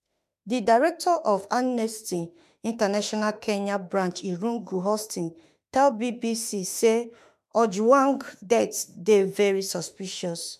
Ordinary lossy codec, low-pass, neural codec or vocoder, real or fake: MP3, 96 kbps; 14.4 kHz; autoencoder, 48 kHz, 32 numbers a frame, DAC-VAE, trained on Japanese speech; fake